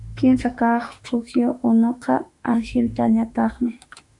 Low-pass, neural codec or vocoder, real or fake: 10.8 kHz; autoencoder, 48 kHz, 32 numbers a frame, DAC-VAE, trained on Japanese speech; fake